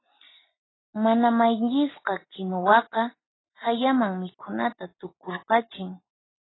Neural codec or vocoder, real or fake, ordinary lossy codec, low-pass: none; real; AAC, 16 kbps; 7.2 kHz